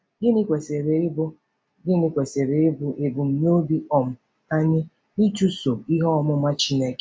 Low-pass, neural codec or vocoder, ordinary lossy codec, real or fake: none; none; none; real